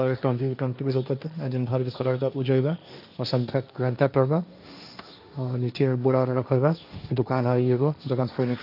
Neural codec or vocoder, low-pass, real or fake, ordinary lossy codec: codec, 16 kHz, 1.1 kbps, Voila-Tokenizer; 5.4 kHz; fake; none